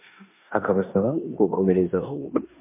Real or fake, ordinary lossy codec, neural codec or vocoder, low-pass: fake; MP3, 24 kbps; codec, 16 kHz in and 24 kHz out, 0.9 kbps, LongCat-Audio-Codec, four codebook decoder; 3.6 kHz